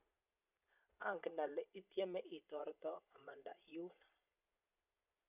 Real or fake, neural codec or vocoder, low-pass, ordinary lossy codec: fake; vocoder, 22.05 kHz, 80 mel bands, Vocos; 3.6 kHz; none